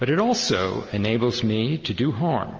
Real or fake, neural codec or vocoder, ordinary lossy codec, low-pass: real; none; Opus, 24 kbps; 7.2 kHz